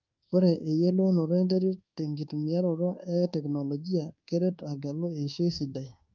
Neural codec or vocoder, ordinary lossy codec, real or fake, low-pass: codec, 24 kHz, 1.2 kbps, DualCodec; Opus, 24 kbps; fake; 7.2 kHz